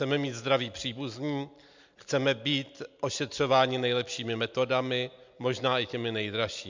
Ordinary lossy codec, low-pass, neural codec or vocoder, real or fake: MP3, 64 kbps; 7.2 kHz; none; real